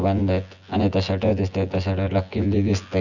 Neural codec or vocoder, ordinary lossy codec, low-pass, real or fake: vocoder, 24 kHz, 100 mel bands, Vocos; none; 7.2 kHz; fake